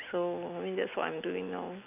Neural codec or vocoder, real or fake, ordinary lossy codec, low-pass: none; real; none; 3.6 kHz